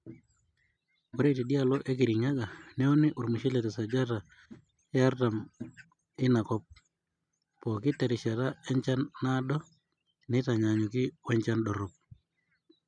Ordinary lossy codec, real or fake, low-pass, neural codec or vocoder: MP3, 96 kbps; real; 9.9 kHz; none